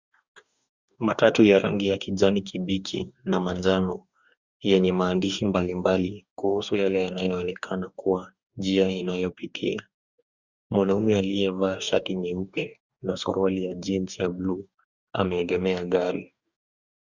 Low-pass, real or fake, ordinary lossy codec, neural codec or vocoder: 7.2 kHz; fake; Opus, 64 kbps; codec, 44.1 kHz, 2.6 kbps, DAC